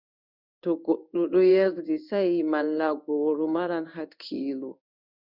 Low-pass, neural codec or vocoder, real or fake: 5.4 kHz; codec, 16 kHz in and 24 kHz out, 1 kbps, XY-Tokenizer; fake